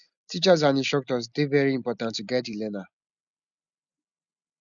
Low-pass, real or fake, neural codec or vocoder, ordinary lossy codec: 7.2 kHz; real; none; none